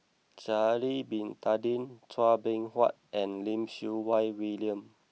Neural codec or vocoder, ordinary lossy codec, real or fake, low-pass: none; none; real; none